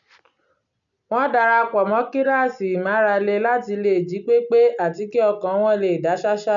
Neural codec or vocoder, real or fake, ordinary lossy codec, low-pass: none; real; none; 7.2 kHz